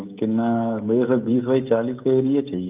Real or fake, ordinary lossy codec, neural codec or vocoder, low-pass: fake; Opus, 24 kbps; codec, 16 kHz, 16 kbps, FreqCodec, smaller model; 3.6 kHz